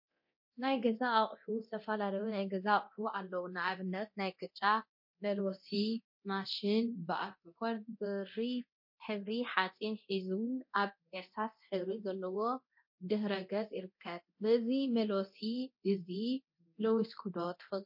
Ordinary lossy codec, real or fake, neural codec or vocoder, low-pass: MP3, 32 kbps; fake; codec, 24 kHz, 0.9 kbps, DualCodec; 5.4 kHz